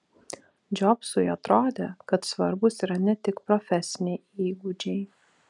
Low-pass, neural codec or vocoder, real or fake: 10.8 kHz; none; real